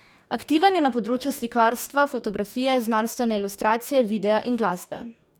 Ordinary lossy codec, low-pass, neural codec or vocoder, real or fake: none; none; codec, 44.1 kHz, 2.6 kbps, DAC; fake